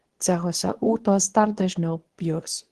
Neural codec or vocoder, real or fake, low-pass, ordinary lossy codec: codec, 24 kHz, 0.9 kbps, WavTokenizer, medium speech release version 2; fake; 10.8 kHz; Opus, 16 kbps